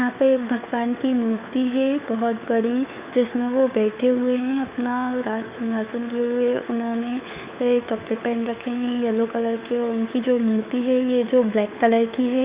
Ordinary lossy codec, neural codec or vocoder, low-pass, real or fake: Opus, 64 kbps; codec, 16 kHz, 4 kbps, FunCodec, trained on LibriTTS, 50 frames a second; 3.6 kHz; fake